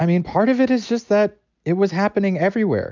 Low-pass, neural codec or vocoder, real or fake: 7.2 kHz; none; real